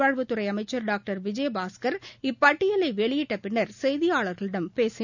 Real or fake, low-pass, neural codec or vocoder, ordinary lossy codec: real; 7.2 kHz; none; none